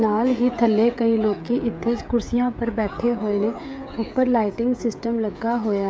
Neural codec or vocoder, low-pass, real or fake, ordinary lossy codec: codec, 16 kHz, 16 kbps, FreqCodec, smaller model; none; fake; none